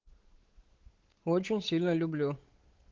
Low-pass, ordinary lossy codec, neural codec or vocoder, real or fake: 7.2 kHz; Opus, 16 kbps; codec, 16 kHz, 8 kbps, FunCodec, trained on Chinese and English, 25 frames a second; fake